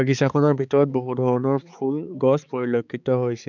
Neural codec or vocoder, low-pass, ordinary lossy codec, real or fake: codec, 16 kHz, 4 kbps, X-Codec, HuBERT features, trained on balanced general audio; 7.2 kHz; none; fake